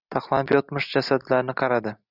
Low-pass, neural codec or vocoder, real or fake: 5.4 kHz; none; real